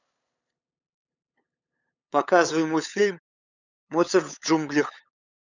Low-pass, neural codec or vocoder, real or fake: 7.2 kHz; codec, 16 kHz, 8 kbps, FunCodec, trained on LibriTTS, 25 frames a second; fake